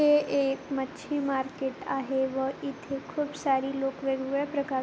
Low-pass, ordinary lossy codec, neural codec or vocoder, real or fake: none; none; none; real